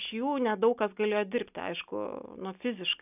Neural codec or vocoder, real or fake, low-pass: none; real; 3.6 kHz